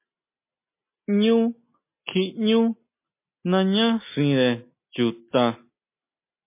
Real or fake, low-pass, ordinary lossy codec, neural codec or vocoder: real; 3.6 kHz; MP3, 24 kbps; none